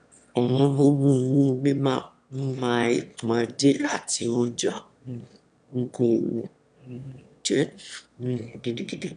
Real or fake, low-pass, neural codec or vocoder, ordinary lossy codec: fake; 9.9 kHz; autoencoder, 22.05 kHz, a latent of 192 numbers a frame, VITS, trained on one speaker; none